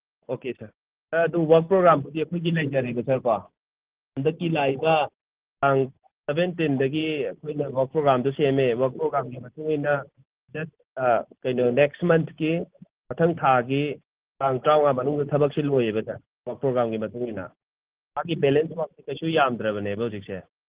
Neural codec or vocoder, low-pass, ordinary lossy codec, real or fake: none; 3.6 kHz; Opus, 16 kbps; real